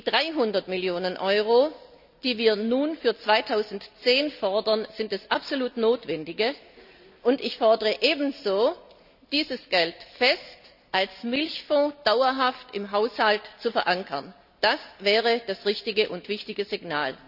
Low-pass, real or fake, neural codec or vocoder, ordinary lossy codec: 5.4 kHz; real; none; none